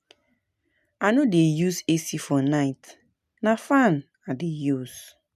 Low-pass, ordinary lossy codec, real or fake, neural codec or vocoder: 14.4 kHz; none; real; none